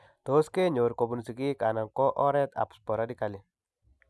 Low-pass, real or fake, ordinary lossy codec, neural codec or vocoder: none; real; none; none